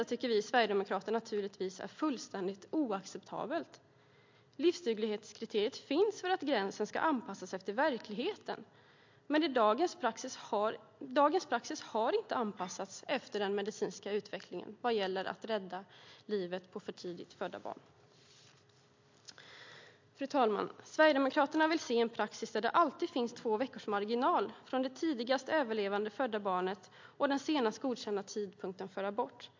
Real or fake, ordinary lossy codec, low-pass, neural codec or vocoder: real; MP3, 48 kbps; 7.2 kHz; none